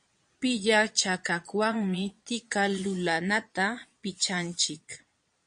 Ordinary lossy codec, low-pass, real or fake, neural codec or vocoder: MP3, 48 kbps; 9.9 kHz; fake; vocoder, 22.05 kHz, 80 mel bands, Vocos